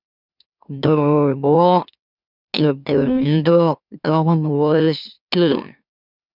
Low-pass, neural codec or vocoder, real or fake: 5.4 kHz; autoencoder, 44.1 kHz, a latent of 192 numbers a frame, MeloTTS; fake